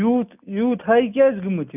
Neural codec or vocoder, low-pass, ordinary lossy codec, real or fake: none; 3.6 kHz; none; real